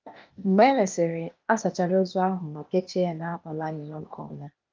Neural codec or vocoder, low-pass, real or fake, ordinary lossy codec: codec, 16 kHz, 0.8 kbps, ZipCodec; 7.2 kHz; fake; Opus, 24 kbps